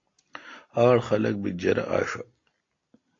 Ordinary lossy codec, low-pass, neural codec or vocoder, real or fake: AAC, 32 kbps; 7.2 kHz; none; real